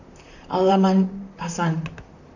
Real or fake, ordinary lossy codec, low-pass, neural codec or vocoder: fake; none; 7.2 kHz; vocoder, 44.1 kHz, 128 mel bands, Pupu-Vocoder